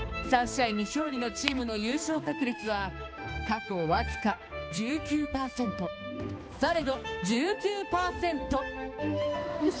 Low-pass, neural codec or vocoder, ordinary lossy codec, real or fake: none; codec, 16 kHz, 2 kbps, X-Codec, HuBERT features, trained on balanced general audio; none; fake